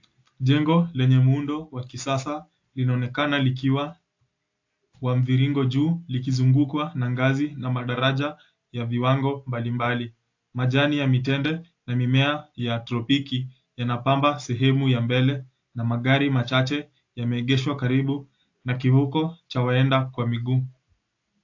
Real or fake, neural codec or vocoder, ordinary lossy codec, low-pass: real; none; AAC, 48 kbps; 7.2 kHz